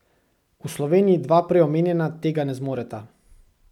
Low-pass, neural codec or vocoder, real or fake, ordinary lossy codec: 19.8 kHz; none; real; none